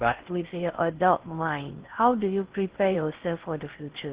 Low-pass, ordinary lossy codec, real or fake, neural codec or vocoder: 3.6 kHz; Opus, 16 kbps; fake; codec, 16 kHz in and 24 kHz out, 0.6 kbps, FocalCodec, streaming, 4096 codes